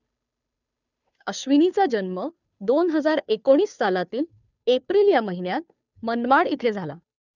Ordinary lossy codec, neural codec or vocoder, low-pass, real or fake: none; codec, 16 kHz, 2 kbps, FunCodec, trained on Chinese and English, 25 frames a second; 7.2 kHz; fake